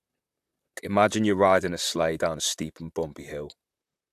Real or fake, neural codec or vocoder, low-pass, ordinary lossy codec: fake; vocoder, 44.1 kHz, 128 mel bands, Pupu-Vocoder; 14.4 kHz; AAC, 96 kbps